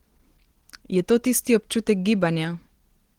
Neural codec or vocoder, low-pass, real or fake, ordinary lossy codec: none; 19.8 kHz; real; Opus, 16 kbps